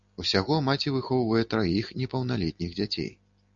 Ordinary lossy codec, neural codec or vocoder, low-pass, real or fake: MP3, 64 kbps; none; 7.2 kHz; real